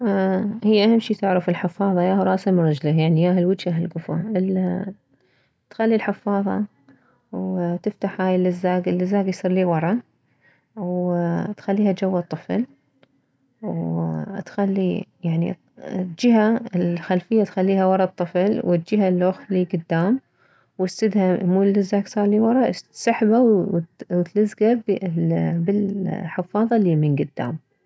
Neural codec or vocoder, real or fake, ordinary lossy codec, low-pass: none; real; none; none